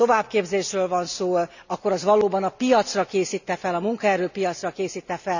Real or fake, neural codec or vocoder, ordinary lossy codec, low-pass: real; none; none; 7.2 kHz